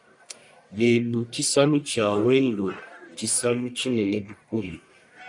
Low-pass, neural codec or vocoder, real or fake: 10.8 kHz; codec, 44.1 kHz, 1.7 kbps, Pupu-Codec; fake